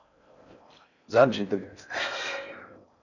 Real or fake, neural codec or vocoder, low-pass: fake; codec, 16 kHz in and 24 kHz out, 0.6 kbps, FocalCodec, streaming, 4096 codes; 7.2 kHz